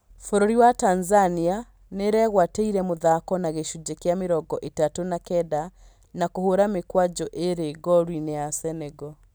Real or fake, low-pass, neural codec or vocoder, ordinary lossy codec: real; none; none; none